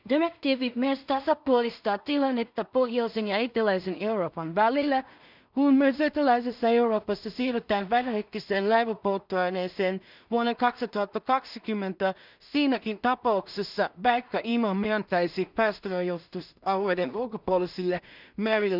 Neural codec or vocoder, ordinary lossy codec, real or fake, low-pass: codec, 16 kHz in and 24 kHz out, 0.4 kbps, LongCat-Audio-Codec, two codebook decoder; none; fake; 5.4 kHz